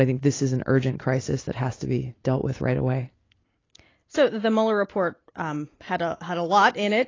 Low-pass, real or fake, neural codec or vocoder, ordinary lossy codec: 7.2 kHz; real; none; AAC, 32 kbps